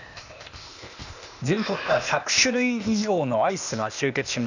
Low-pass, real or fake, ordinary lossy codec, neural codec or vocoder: 7.2 kHz; fake; none; codec, 16 kHz, 0.8 kbps, ZipCodec